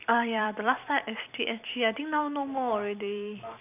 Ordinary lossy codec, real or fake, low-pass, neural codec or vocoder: none; fake; 3.6 kHz; vocoder, 44.1 kHz, 128 mel bands every 256 samples, BigVGAN v2